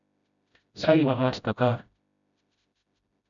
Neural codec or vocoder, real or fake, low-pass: codec, 16 kHz, 0.5 kbps, FreqCodec, smaller model; fake; 7.2 kHz